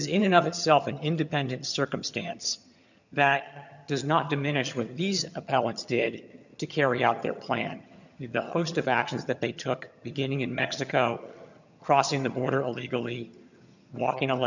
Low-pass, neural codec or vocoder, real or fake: 7.2 kHz; vocoder, 22.05 kHz, 80 mel bands, HiFi-GAN; fake